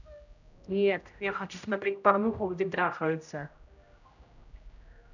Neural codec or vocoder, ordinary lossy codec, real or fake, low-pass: codec, 16 kHz, 0.5 kbps, X-Codec, HuBERT features, trained on general audio; none; fake; 7.2 kHz